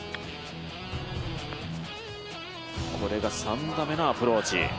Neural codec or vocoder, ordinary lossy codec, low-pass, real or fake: none; none; none; real